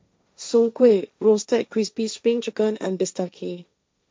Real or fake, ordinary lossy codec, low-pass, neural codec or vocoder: fake; none; none; codec, 16 kHz, 1.1 kbps, Voila-Tokenizer